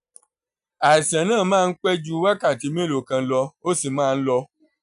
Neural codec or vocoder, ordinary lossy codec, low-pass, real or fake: none; none; 10.8 kHz; real